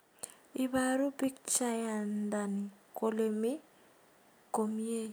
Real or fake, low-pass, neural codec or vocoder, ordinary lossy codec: real; none; none; none